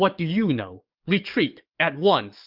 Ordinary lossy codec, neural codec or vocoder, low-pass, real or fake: Opus, 24 kbps; codec, 44.1 kHz, 7.8 kbps, DAC; 5.4 kHz; fake